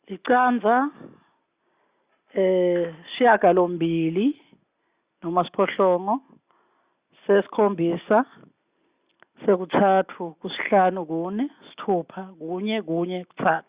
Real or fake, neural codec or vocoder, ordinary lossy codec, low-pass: real; none; Opus, 64 kbps; 3.6 kHz